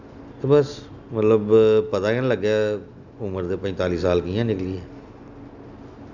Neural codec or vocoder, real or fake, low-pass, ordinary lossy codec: none; real; 7.2 kHz; none